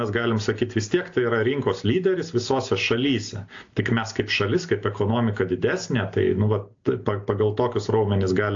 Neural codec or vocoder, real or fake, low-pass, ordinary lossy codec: none; real; 7.2 kHz; AAC, 48 kbps